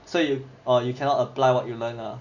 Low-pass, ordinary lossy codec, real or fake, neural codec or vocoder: 7.2 kHz; none; real; none